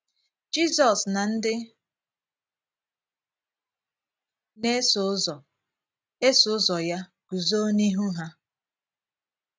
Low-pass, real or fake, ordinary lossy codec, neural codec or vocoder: none; real; none; none